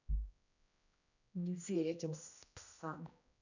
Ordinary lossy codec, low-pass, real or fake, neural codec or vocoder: none; 7.2 kHz; fake; codec, 16 kHz, 1 kbps, X-Codec, HuBERT features, trained on general audio